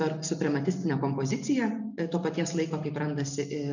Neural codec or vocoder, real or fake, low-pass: none; real; 7.2 kHz